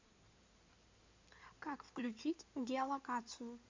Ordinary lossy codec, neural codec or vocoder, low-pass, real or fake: MP3, 64 kbps; codec, 16 kHz in and 24 kHz out, 2.2 kbps, FireRedTTS-2 codec; 7.2 kHz; fake